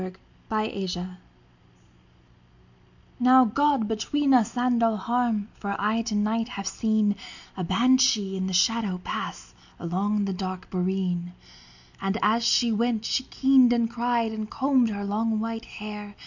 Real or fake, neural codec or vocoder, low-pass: real; none; 7.2 kHz